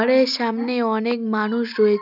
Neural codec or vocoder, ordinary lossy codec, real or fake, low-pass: none; none; real; 5.4 kHz